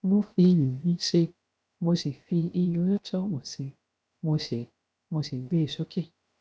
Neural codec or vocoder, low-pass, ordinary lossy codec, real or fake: codec, 16 kHz, 0.7 kbps, FocalCodec; none; none; fake